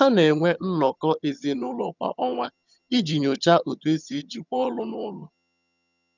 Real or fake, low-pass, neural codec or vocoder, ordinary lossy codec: fake; 7.2 kHz; vocoder, 22.05 kHz, 80 mel bands, HiFi-GAN; none